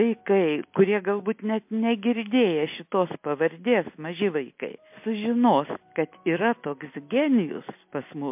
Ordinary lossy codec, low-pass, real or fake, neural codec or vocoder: MP3, 32 kbps; 3.6 kHz; real; none